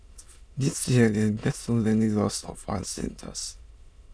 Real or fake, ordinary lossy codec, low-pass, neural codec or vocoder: fake; none; none; autoencoder, 22.05 kHz, a latent of 192 numbers a frame, VITS, trained on many speakers